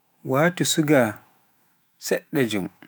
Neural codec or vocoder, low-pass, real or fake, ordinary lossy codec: autoencoder, 48 kHz, 128 numbers a frame, DAC-VAE, trained on Japanese speech; none; fake; none